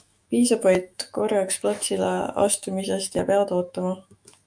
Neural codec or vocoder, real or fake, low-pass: autoencoder, 48 kHz, 128 numbers a frame, DAC-VAE, trained on Japanese speech; fake; 9.9 kHz